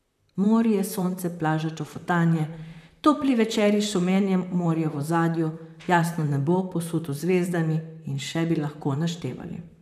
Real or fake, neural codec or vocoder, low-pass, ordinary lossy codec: fake; vocoder, 44.1 kHz, 128 mel bands, Pupu-Vocoder; 14.4 kHz; none